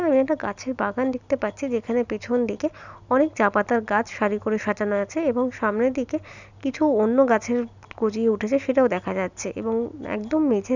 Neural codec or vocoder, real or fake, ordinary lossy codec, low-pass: none; real; none; 7.2 kHz